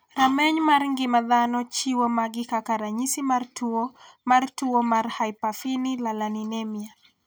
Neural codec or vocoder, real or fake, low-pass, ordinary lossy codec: none; real; none; none